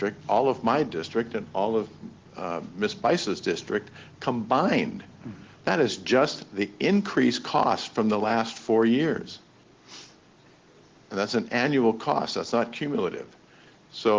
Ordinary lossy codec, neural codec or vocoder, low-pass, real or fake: Opus, 16 kbps; none; 7.2 kHz; real